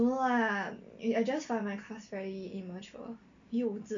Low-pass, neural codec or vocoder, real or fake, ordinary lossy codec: 7.2 kHz; none; real; MP3, 64 kbps